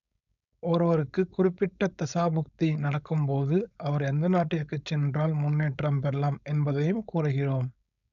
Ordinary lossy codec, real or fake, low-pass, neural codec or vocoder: none; fake; 7.2 kHz; codec, 16 kHz, 4.8 kbps, FACodec